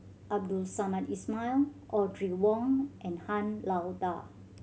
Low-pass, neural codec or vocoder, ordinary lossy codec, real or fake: none; none; none; real